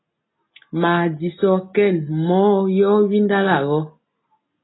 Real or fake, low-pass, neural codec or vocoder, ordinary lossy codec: real; 7.2 kHz; none; AAC, 16 kbps